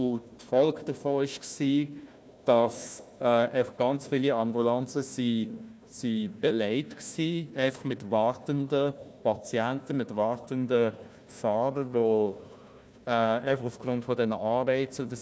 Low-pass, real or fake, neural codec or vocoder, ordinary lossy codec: none; fake; codec, 16 kHz, 1 kbps, FunCodec, trained on Chinese and English, 50 frames a second; none